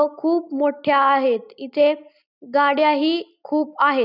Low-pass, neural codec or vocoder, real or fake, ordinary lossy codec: 5.4 kHz; none; real; none